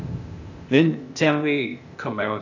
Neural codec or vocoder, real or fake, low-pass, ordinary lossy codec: codec, 16 kHz, 0.8 kbps, ZipCodec; fake; 7.2 kHz; AAC, 48 kbps